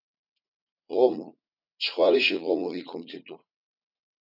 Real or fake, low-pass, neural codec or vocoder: fake; 5.4 kHz; vocoder, 44.1 kHz, 80 mel bands, Vocos